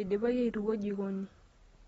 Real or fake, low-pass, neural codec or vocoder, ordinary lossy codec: real; 10.8 kHz; none; AAC, 24 kbps